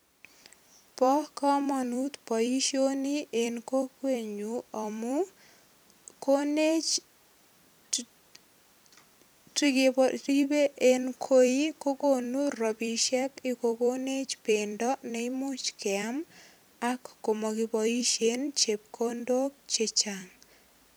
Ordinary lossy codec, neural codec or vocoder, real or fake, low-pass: none; vocoder, 44.1 kHz, 128 mel bands every 256 samples, BigVGAN v2; fake; none